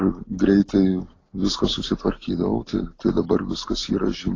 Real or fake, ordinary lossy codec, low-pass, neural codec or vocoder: real; AAC, 32 kbps; 7.2 kHz; none